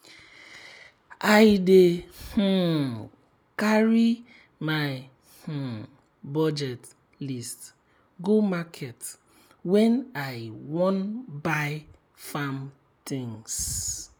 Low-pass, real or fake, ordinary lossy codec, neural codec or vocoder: none; real; none; none